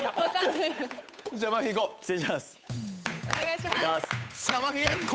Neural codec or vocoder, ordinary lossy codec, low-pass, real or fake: codec, 16 kHz, 8 kbps, FunCodec, trained on Chinese and English, 25 frames a second; none; none; fake